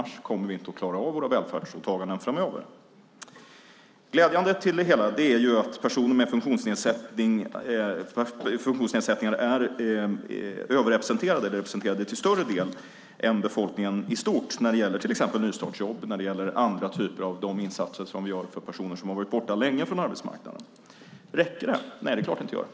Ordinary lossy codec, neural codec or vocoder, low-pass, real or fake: none; none; none; real